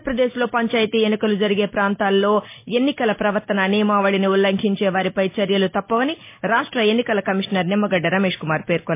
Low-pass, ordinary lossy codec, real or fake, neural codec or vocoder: 3.6 kHz; MP3, 24 kbps; real; none